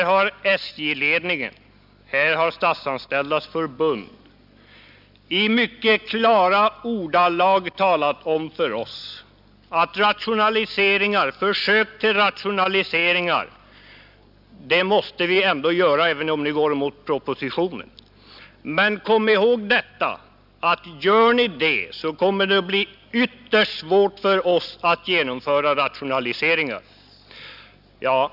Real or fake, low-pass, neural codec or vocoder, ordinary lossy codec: real; 5.4 kHz; none; none